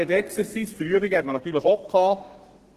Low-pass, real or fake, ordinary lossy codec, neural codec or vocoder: 14.4 kHz; fake; Opus, 16 kbps; codec, 32 kHz, 1.9 kbps, SNAC